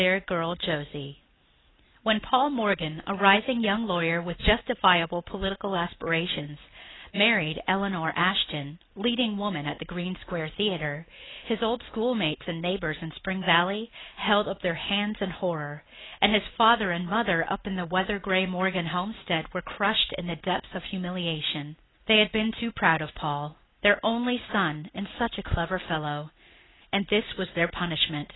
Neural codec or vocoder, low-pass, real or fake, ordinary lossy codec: none; 7.2 kHz; real; AAC, 16 kbps